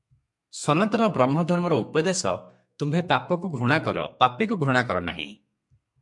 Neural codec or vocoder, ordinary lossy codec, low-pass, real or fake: codec, 32 kHz, 1.9 kbps, SNAC; MP3, 64 kbps; 10.8 kHz; fake